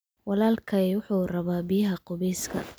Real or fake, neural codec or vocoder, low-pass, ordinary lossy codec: real; none; none; none